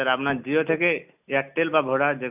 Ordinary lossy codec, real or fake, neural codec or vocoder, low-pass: none; real; none; 3.6 kHz